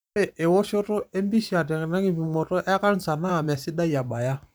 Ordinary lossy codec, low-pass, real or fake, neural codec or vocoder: none; none; fake; vocoder, 44.1 kHz, 128 mel bands every 512 samples, BigVGAN v2